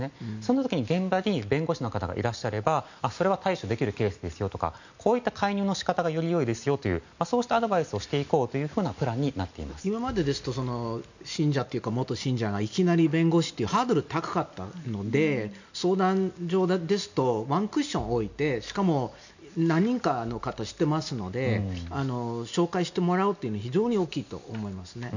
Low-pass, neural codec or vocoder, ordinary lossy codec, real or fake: 7.2 kHz; none; none; real